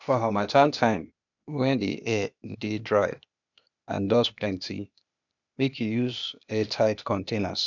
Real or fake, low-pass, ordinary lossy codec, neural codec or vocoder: fake; 7.2 kHz; none; codec, 16 kHz, 0.8 kbps, ZipCodec